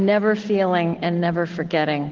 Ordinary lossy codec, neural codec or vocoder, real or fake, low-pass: Opus, 16 kbps; none; real; 7.2 kHz